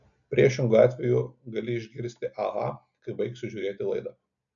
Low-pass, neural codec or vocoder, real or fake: 7.2 kHz; none; real